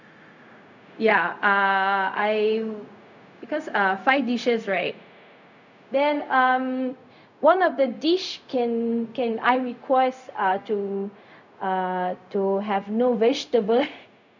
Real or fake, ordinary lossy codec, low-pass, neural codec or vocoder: fake; none; 7.2 kHz; codec, 16 kHz, 0.4 kbps, LongCat-Audio-Codec